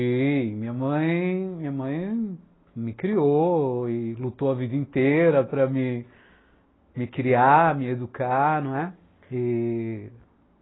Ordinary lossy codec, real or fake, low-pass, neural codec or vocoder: AAC, 16 kbps; real; 7.2 kHz; none